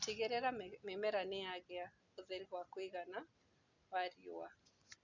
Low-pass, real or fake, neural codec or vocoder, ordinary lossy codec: 7.2 kHz; real; none; none